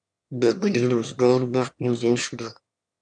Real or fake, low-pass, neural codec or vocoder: fake; 9.9 kHz; autoencoder, 22.05 kHz, a latent of 192 numbers a frame, VITS, trained on one speaker